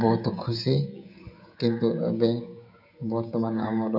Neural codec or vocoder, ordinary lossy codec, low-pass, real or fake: codec, 16 kHz, 16 kbps, FreqCodec, smaller model; none; 5.4 kHz; fake